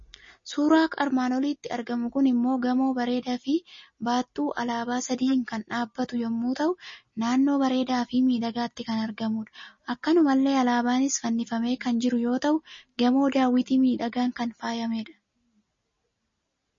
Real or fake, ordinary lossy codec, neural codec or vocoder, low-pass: real; MP3, 32 kbps; none; 7.2 kHz